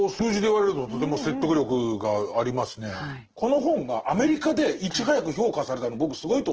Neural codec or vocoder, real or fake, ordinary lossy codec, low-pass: none; real; Opus, 16 kbps; 7.2 kHz